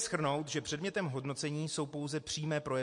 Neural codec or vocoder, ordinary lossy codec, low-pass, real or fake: none; MP3, 48 kbps; 10.8 kHz; real